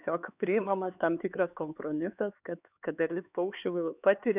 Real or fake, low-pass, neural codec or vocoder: fake; 3.6 kHz; codec, 16 kHz, 4 kbps, X-Codec, HuBERT features, trained on LibriSpeech